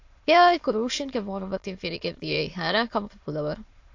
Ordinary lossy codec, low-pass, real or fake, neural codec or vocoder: AAC, 48 kbps; 7.2 kHz; fake; autoencoder, 22.05 kHz, a latent of 192 numbers a frame, VITS, trained on many speakers